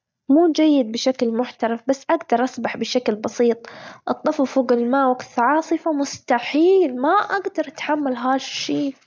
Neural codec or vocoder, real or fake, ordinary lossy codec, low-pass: none; real; none; none